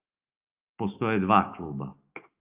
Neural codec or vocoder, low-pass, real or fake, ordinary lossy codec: autoencoder, 48 kHz, 128 numbers a frame, DAC-VAE, trained on Japanese speech; 3.6 kHz; fake; Opus, 24 kbps